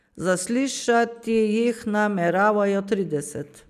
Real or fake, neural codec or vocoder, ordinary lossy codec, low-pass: real; none; none; 14.4 kHz